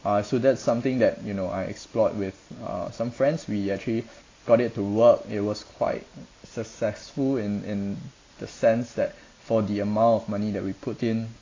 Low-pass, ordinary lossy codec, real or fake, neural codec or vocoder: 7.2 kHz; AAC, 32 kbps; real; none